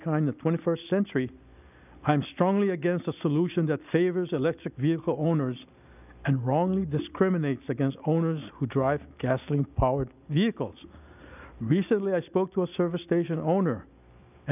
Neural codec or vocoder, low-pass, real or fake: none; 3.6 kHz; real